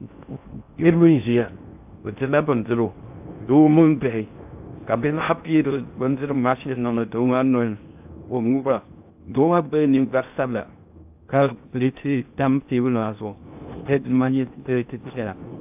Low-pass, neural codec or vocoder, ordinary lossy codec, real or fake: 3.6 kHz; codec, 16 kHz in and 24 kHz out, 0.6 kbps, FocalCodec, streaming, 4096 codes; AAC, 32 kbps; fake